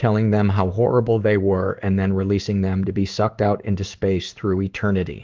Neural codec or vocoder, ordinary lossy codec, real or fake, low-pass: codec, 16 kHz in and 24 kHz out, 1 kbps, XY-Tokenizer; Opus, 32 kbps; fake; 7.2 kHz